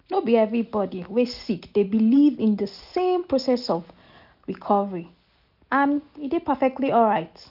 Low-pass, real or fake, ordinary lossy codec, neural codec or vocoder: 5.4 kHz; real; none; none